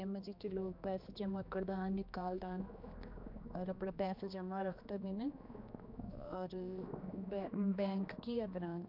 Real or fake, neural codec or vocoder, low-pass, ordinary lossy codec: fake; codec, 16 kHz, 2 kbps, X-Codec, HuBERT features, trained on general audio; 5.4 kHz; MP3, 48 kbps